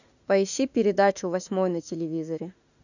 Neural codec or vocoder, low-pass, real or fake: autoencoder, 48 kHz, 32 numbers a frame, DAC-VAE, trained on Japanese speech; 7.2 kHz; fake